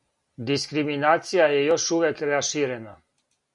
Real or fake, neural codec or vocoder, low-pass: real; none; 10.8 kHz